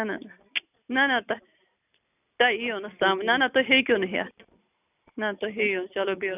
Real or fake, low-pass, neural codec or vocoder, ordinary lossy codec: real; 3.6 kHz; none; none